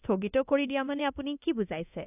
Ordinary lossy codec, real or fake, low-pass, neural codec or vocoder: AAC, 32 kbps; fake; 3.6 kHz; codec, 24 kHz, 0.9 kbps, DualCodec